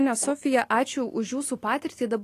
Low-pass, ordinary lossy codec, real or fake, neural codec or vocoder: 14.4 kHz; AAC, 48 kbps; real; none